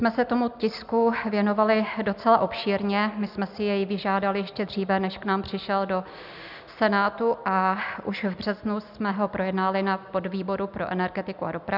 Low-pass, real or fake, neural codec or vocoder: 5.4 kHz; real; none